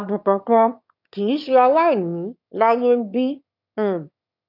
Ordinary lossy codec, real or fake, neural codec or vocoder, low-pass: none; fake; autoencoder, 22.05 kHz, a latent of 192 numbers a frame, VITS, trained on one speaker; 5.4 kHz